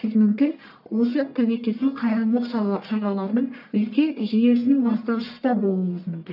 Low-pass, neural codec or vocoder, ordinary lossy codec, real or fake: 5.4 kHz; codec, 44.1 kHz, 1.7 kbps, Pupu-Codec; none; fake